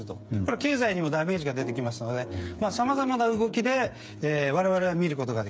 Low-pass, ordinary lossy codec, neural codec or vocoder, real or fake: none; none; codec, 16 kHz, 8 kbps, FreqCodec, smaller model; fake